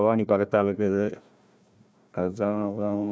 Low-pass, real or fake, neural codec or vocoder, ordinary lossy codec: none; fake; codec, 16 kHz, 1 kbps, FunCodec, trained on Chinese and English, 50 frames a second; none